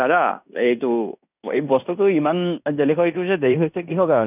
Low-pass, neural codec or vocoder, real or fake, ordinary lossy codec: 3.6 kHz; codec, 16 kHz in and 24 kHz out, 0.9 kbps, LongCat-Audio-Codec, fine tuned four codebook decoder; fake; none